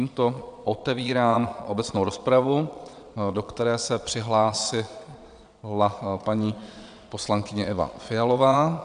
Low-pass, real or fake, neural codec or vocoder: 9.9 kHz; fake; vocoder, 22.05 kHz, 80 mel bands, Vocos